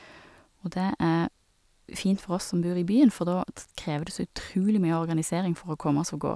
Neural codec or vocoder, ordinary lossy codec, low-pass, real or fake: none; none; none; real